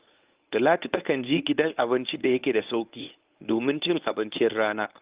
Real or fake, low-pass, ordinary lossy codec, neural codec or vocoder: fake; 3.6 kHz; Opus, 32 kbps; codec, 24 kHz, 0.9 kbps, WavTokenizer, medium speech release version 1